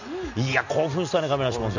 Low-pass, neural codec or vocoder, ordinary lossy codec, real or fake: 7.2 kHz; none; none; real